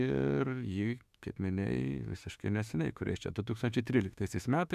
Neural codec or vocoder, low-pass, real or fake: autoencoder, 48 kHz, 32 numbers a frame, DAC-VAE, trained on Japanese speech; 14.4 kHz; fake